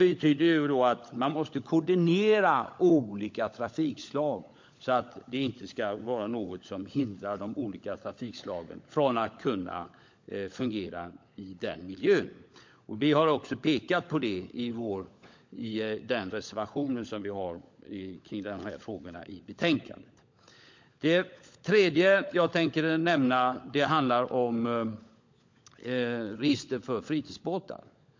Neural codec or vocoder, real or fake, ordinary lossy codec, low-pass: codec, 16 kHz, 16 kbps, FunCodec, trained on LibriTTS, 50 frames a second; fake; MP3, 48 kbps; 7.2 kHz